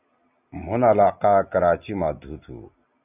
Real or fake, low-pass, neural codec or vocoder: real; 3.6 kHz; none